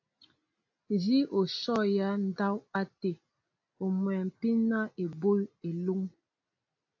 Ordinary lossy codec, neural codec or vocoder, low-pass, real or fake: AAC, 48 kbps; none; 7.2 kHz; real